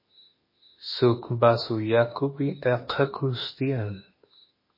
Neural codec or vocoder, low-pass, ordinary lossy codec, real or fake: autoencoder, 48 kHz, 32 numbers a frame, DAC-VAE, trained on Japanese speech; 5.4 kHz; MP3, 24 kbps; fake